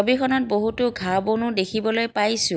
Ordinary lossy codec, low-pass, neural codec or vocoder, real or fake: none; none; none; real